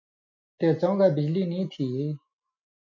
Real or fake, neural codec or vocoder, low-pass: real; none; 7.2 kHz